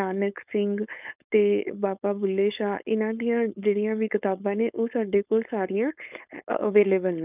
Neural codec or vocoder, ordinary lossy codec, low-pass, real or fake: codec, 16 kHz, 4.8 kbps, FACodec; none; 3.6 kHz; fake